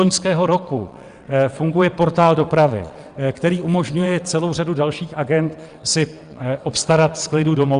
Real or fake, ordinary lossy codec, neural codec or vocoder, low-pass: fake; Opus, 64 kbps; vocoder, 22.05 kHz, 80 mel bands, WaveNeXt; 9.9 kHz